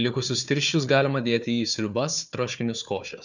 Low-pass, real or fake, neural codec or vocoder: 7.2 kHz; fake; codec, 16 kHz, 4 kbps, FunCodec, trained on Chinese and English, 50 frames a second